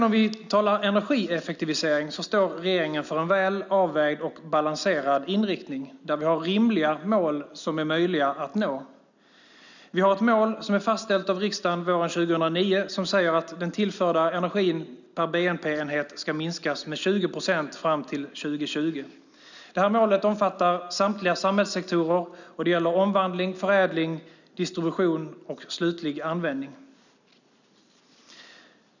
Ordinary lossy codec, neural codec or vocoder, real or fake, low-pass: none; none; real; 7.2 kHz